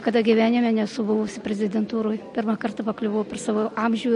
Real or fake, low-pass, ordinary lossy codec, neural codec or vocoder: real; 14.4 kHz; MP3, 48 kbps; none